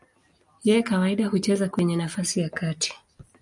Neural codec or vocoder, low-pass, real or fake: none; 10.8 kHz; real